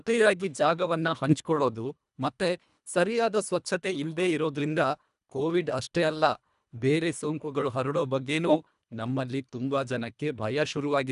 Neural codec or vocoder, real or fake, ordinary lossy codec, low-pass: codec, 24 kHz, 1.5 kbps, HILCodec; fake; none; 10.8 kHz